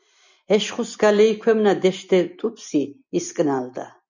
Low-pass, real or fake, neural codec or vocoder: 7.2 kHz; real; none